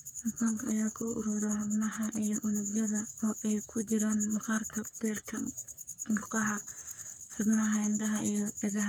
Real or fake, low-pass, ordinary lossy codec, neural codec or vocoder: fake; none; none; codec, 44.1 kHz, 3.4 kbps, Pupu-Codec